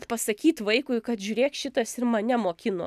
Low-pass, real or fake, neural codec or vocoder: 14.4 kHz; real; none